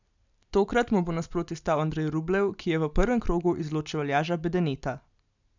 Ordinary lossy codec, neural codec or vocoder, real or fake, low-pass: none; none; real; 7.2 kHz